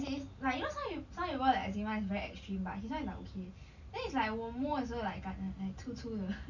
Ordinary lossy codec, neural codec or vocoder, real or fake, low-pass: none; none; real; 7.2 kHz